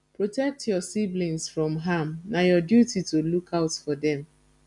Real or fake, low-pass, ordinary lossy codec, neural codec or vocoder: real; 10.8 kHz; none; none